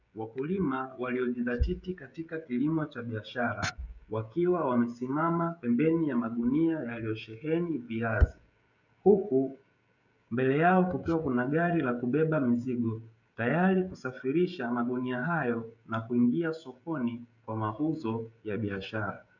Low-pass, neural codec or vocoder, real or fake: 7.2 kHz; codec, 16 kHz, 16 kbps, FreqCodec, smaller model; fake